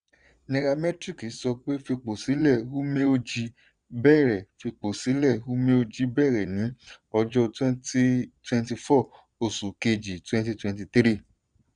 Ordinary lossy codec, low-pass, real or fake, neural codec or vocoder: none; 9.9 kHz; fake; vocoder, 22.05 kHz, 80 mel bands, Vocos